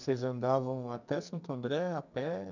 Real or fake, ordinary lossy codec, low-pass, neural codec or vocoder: fake; none; 7.2 kHz; codec, 44.1 kHz, 2.6 kbps, SNAC